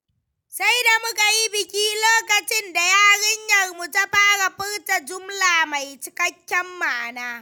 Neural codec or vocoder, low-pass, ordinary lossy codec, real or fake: none; none; none; real